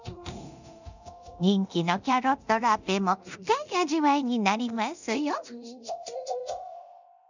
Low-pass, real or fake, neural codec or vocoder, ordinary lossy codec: 7.2 kHz; fake; codec, 24 kHz, 0.9 kbps, DualCodec; none